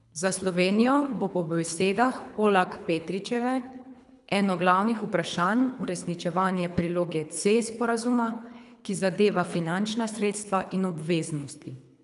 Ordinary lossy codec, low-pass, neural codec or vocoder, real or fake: none; 10.8 kHz; codec, 24 kHz, 3 kbps, HILCodec; fake